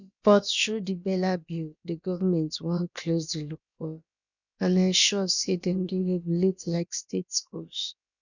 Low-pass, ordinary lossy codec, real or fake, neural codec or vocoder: 7.2 kHz; none; fake; codec, 16 kHz, about 1 kbps, DyCAST, with the encoder's durations